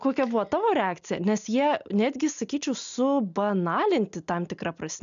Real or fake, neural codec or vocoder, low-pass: real; none; 7.2 kHz